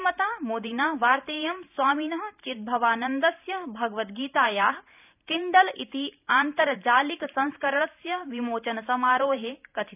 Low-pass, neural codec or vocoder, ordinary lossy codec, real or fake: 3.6 kHz; vocoder, 44.1 kHz, 128 mel bands every 512 samples, BigVGAN v2; none; fake